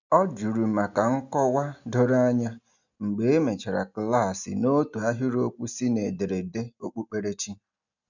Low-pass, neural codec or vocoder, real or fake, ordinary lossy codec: 7.2 kHz; none; real; none